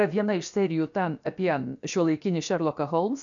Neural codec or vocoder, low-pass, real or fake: codec, 16 kHz, about 1 kbps, DyCAST, with the encoder's durations; 7.2 kHz; fake